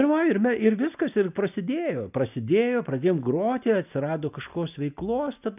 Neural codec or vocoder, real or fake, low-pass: none; real; 3.6 kHz